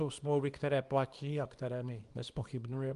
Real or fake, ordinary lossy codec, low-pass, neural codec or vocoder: fake; Opus, 32 kbps; 10.8 kHz; codec, 24 kHz, 0.9 kbps, WavTokenizer, small release